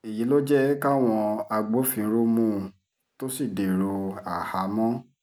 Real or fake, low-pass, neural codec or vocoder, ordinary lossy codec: real; none; none; none